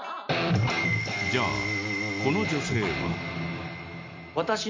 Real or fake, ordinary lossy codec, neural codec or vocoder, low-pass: real; MP3, 48 kbps; none; 7.2 kHz